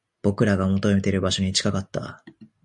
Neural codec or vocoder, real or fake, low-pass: none; real; 10.8 kHz